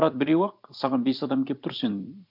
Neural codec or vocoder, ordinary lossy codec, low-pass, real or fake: vocoder, 44.1 kHz, 128 mel bands every 256 samples, BigVGAN v2; none; 5.4 kHz; fake